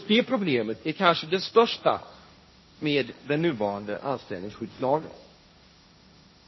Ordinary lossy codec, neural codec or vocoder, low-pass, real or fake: MP3, 24 kbps; codec, 16 kHz, 1.1 kbps, Voila-Tokenizer; 7.2 kHz; fake